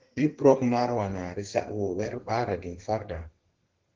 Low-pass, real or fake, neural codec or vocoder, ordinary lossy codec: 7.2 kHz; fake; codec, 32 kHz, 1.9 kbps, SNAC; Opus, 16 kbps